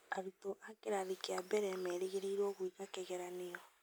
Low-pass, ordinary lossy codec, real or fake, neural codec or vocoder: none; none; real; none